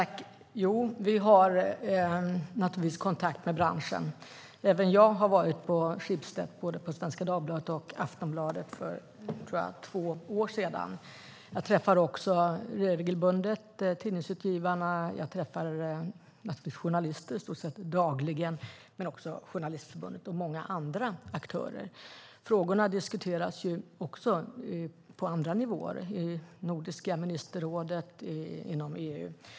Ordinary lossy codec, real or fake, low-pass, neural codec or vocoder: none; real; none; none